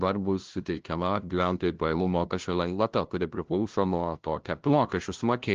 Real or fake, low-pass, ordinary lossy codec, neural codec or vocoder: fake; 7.2 kHz; Opus, 16 kbps; codec, 16 kHz, 0.5 kbps, FunCodec, trained on LibriTTS, 25 frames a second